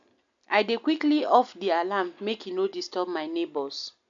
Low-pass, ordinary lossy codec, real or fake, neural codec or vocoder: 7.2 kHz; AAC, 64 kbps; real; none